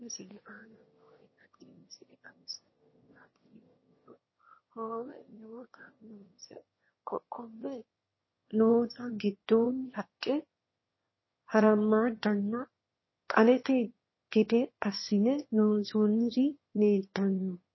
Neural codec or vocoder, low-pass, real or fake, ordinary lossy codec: autoencoder, 22.05 kHz, a latent of 192 numbers a frame, VITS, trained on one speaker; 7.2 kHz; fake; MP3, 24 kbps